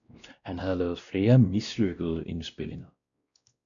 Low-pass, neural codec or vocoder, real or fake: 7.2 kHz; codec, 16 kHz, 1 kbps, X-Codec, WavLM features, trained on Multilingual LibriSpeech; fake